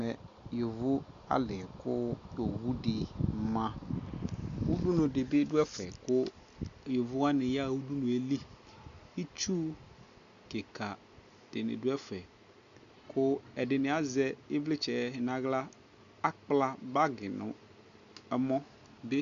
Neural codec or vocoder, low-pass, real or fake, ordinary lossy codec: none; 7.2 kHz; real; Opus, 64 kbps